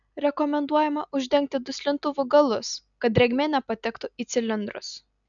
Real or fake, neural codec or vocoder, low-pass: real; none; 7.2 kHz